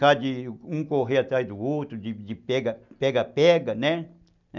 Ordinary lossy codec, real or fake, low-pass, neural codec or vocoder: none; real; 7.2 kHz; none